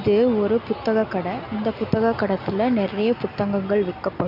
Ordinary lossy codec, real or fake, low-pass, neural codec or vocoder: none; real; 5.4 kHz; none